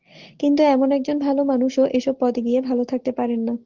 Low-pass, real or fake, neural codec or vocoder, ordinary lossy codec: 7.2 kHz; real; none; Opus, 16 kbps